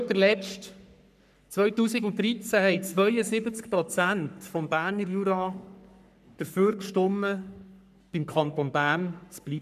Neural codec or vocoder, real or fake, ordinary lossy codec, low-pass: codec, 44.1 kHz, 3.4 kbps, Pupu-Codec; fake; none; 14.4 kHz